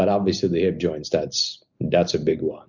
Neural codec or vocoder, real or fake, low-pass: none; real; 7.2 kHz